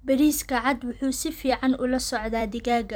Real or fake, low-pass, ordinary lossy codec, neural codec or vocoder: fake; none; none; vocoder, 44.1 kHz, 128 mel bands every 256 samples, BigVGAN v2